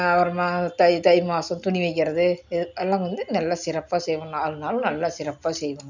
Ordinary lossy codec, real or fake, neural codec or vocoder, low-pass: none; real; none; 7.2 kHz